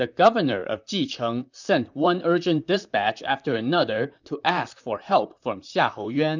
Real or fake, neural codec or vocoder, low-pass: fake; vocoder, 44.1 kHz, 128 mel bands, Pupu-Vocoder; 7.2 kHz